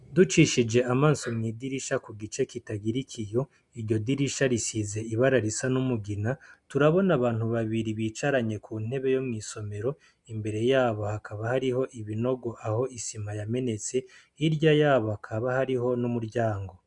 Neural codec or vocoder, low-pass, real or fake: none; 10.8 kHz; real